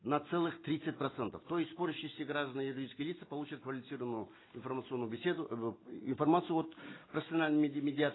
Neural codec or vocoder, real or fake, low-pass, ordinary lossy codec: none; real; 7.2 kHz; AAC, 16 kbps